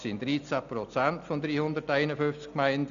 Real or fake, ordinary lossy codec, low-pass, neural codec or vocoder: real; none; 7.2 kHz; none